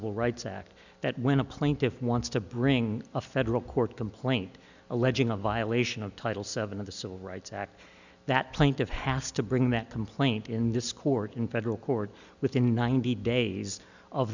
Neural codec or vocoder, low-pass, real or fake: none; 7.2 kHz; real